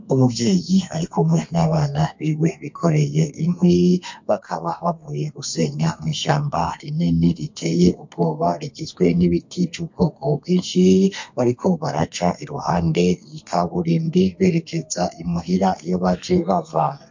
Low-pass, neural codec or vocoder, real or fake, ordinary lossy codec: 7.2 kHz; codec, 32 kHz, 1.9 kbps, SNAC; fake; MP3, 48 kbps